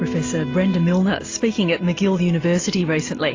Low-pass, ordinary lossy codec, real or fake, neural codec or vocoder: 7.2 kHz; AAC, 32 kbps; real; none